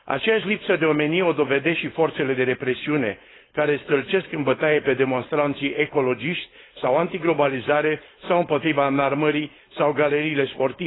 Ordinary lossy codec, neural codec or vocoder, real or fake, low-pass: AAC, 16 kbps; codec, 16 kHz, 4.8 kbps, FACodec; fake; 7.2 kHz